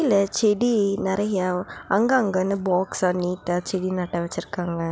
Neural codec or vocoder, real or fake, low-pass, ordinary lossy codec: none; real; none; none